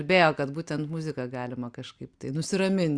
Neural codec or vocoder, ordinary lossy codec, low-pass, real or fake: none; Opus, 64 kbps; 9.9 kHz; real